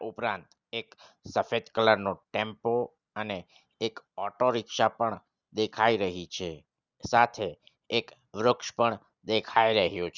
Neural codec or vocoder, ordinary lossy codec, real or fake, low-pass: none; Opus, 64 kbps; real; 7.2 kHz